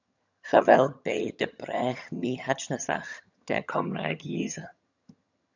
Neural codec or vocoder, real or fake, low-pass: vocoder, 22.05 kHz, 80 mel bands, HiFi-GAN; fake; 7.2 kHz